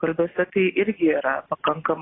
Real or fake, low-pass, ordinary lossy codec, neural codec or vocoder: real; 7.2 kHz; AAC, 16 kbps; none